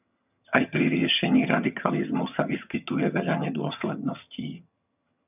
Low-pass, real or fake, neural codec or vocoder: 3.6 kHz; fake; vocoder, 22.05 kHz, 80 mel bands, HiFi-GAN